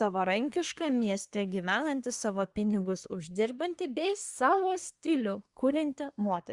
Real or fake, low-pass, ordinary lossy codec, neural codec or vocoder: fake; 10.8 kHz; Opus, 64 kbps; codec, 24 kHz, 1 kbps, SNAC